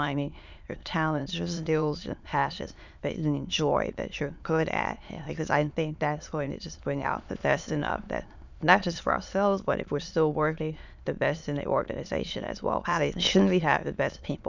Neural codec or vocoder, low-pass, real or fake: autoencoder, 22.05 kHz, a latent of 192 numbers a frame, VITS, trained on many speakers; 7.2 kHz; fake